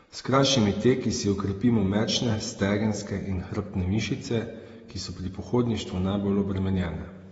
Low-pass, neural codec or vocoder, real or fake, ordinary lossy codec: 19.8 kHz; vocoder, 48 kHz, 128 mel bands, Vocos; fake; AAC, 24 kbps